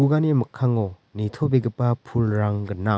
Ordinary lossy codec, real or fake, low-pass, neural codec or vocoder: none; real; none; none